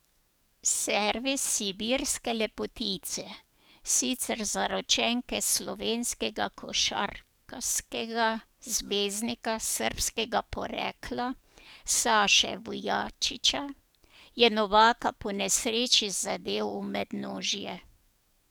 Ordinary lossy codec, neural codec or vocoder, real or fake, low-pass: none; codec, 44.1 kHz, 7.8 kbps, DAC; fake; none